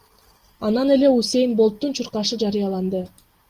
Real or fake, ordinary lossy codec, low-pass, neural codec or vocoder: real; Opus, 24 kbps; 14.4 kHz; none